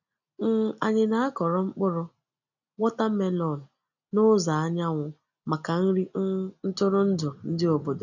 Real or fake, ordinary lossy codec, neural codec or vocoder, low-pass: real; none; none; 7.2 kHz